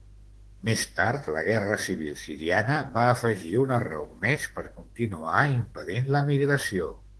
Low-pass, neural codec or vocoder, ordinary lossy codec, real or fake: 10.8 kHz; autoencoder, 48 kHz, 32 numbers a frame, DAC-VAE, trained on Japanese speech; Opus, 16 kbps; fake